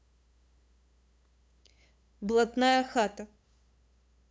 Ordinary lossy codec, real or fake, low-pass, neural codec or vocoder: none; fake; none; codec, 16 kHz, 8 kbps, FunCodec, trained on LibriTTS, 25 frames a second